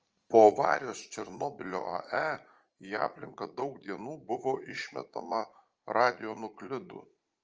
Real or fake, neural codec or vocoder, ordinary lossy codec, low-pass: fake; vocoder, 44.1 kHz, 80 mel bands, Vocos; Opus, 24 kbps; 7.2 kHz